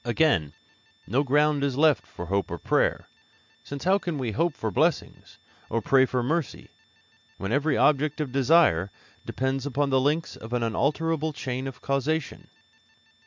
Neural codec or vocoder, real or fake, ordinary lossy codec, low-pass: none; real; MP3, 64 kbps; 7.2 kHz